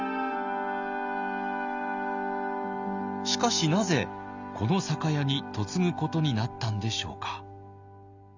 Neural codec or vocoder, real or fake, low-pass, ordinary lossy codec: none; real; 7.2 kHz; none